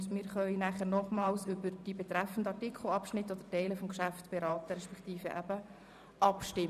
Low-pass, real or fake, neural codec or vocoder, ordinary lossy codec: 14.4 kHz; fake; vocoder, 44.1 kHz, 128 mel bands every 512 samples, BigVGAN v2; none